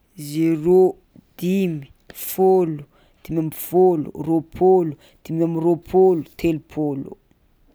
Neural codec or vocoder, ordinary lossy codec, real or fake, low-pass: none; none; real; none